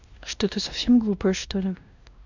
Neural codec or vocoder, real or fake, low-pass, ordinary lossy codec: codec, 16 kHz, 1 kbps, X-Codec, WavLM features, trained on Multilingual LibriSpeech; fake; 7.2 kHz; none